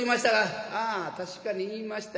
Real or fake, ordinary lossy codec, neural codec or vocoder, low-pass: real; none; none; none